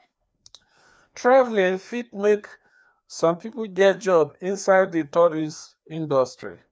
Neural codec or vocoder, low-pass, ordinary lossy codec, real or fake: codec, 16 kHz, 2 kbps, FreqCodec, larger model; none; none; fake